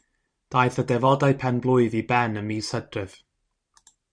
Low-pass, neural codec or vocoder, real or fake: 9.9 kHz; vocoder, 44.1 kHz, 128 mel bands every 512 samples, BigVGAN v2; fake